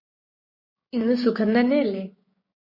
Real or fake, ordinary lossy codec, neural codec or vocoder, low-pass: fake; MP3, 24 kbps; codec, 16 kHz, 6 kbps, DAC; 5.4 kHz